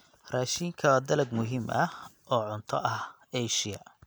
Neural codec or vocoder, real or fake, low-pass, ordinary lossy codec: none; real; none; none